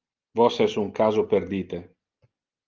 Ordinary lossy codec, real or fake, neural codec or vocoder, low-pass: Opus, 32 kbps; real; none; 7.2 kHz